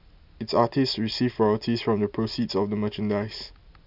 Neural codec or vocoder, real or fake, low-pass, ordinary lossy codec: none; real; 5.4 kHz; none